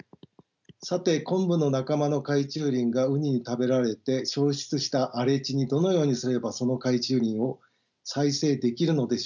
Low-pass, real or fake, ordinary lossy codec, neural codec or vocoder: 7.2 kHz; real; none; none